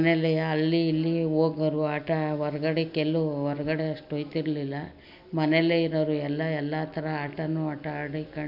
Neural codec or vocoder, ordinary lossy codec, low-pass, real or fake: none; none; 5.4 kHz; real